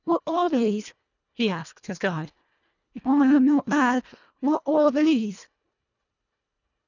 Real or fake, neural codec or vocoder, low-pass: fake; codec, 24 kHz, 1.5 kbps, HILCodec; 7.2 kHz